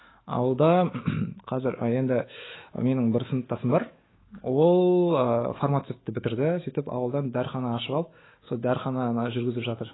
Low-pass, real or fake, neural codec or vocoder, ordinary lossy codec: 7.2 kHz; fake; autoencoder, 48 kHz, 128 numbers a frame, DAC-VAE, trained on Japanese speech; AAC, 16 kbps